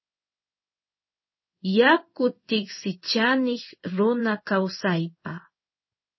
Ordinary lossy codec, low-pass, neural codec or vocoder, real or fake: MP3, 24 kbps; 7.2 kHz; codec, 16 kHz in and 24 kHz out, 1 kbps, XY-Tokenizer; fake